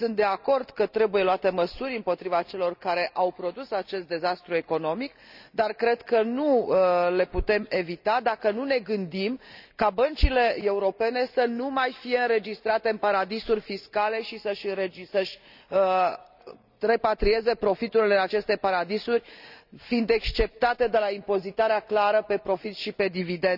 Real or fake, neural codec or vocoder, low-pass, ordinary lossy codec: real; none; 5.4 kHz; none